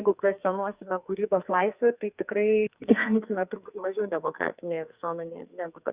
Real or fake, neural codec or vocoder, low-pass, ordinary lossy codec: fake; codec, 44.1 kHz, 2.6 kbps, SNAC; 3.6 kHz; Opus, 64 kbps